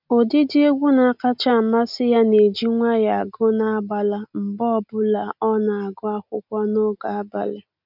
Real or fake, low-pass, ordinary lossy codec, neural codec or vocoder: real; 5.4 kHz; none; none